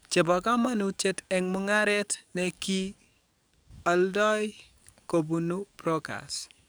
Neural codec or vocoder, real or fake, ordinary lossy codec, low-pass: codec, 44.1 kHz, 7.8 kbps, DAC; fake; none; none